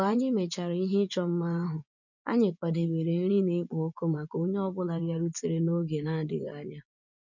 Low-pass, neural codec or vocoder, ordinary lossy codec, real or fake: 7.2 kHz; vocoder, 24 kHz, 100 mel bands, Vocos; none; fake